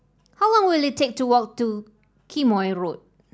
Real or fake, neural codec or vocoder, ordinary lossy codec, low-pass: real; none; none; none